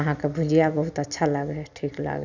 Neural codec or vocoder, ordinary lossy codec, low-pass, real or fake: none; none; 7.2 kHz; real